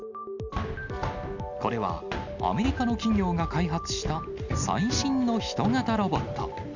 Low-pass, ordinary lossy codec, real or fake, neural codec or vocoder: 7.2 kHz; none; real; none